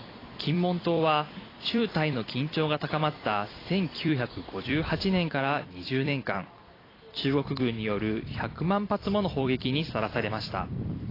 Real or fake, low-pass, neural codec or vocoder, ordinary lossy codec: fake; 5.4 kHz; vocoder, 44.1 kHz, 128 mel bands every 256 samples, BigVGAN v2; AAC, 24 kbps